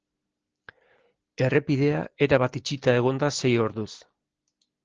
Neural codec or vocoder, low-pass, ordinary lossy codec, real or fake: none; 7.2 kHz; Opus, 16 kbps; real